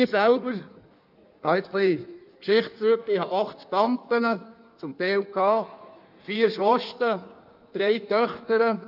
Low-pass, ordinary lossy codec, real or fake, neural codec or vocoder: 5.4 kHz; none; fake; codec, 16 kHz in and 24 kHz out, 1.1 kbps, FireRedTTS-2 codec